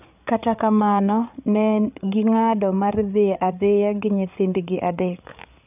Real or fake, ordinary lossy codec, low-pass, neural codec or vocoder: fake; none; 3.6 kHz; codec, 16 kHz, 8 kbps, FreqCodec, larger model